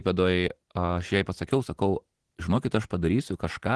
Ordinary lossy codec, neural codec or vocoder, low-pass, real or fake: Opus, 16 kbps; none; 10.8 kHz; real